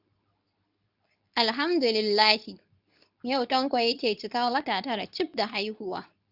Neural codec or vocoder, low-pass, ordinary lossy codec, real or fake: codec, 24 kHz, 0.9 kbps, WavTokenizer, medium speech release version 2; 5.4 kHz; none; fake